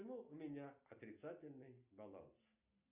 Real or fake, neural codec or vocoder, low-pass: real; none; 3.6 kHz